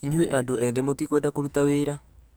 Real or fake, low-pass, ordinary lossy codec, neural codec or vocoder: fake; none; none; codec, 44.1 kHz, 2.6 kbps, SNAC